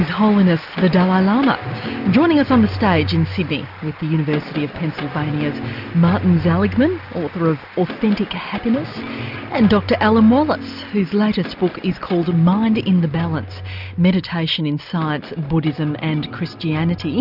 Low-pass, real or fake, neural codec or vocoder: 5.4 kHz; real; none